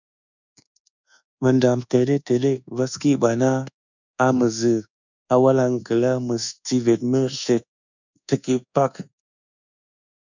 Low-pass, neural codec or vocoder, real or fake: 7.2 kHz; codec, 24 kHz, 1.2 kbps, DualCodec; fake